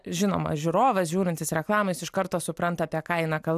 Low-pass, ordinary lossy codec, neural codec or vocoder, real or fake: 14.4 kHz; AAC, 96 kbps; none; real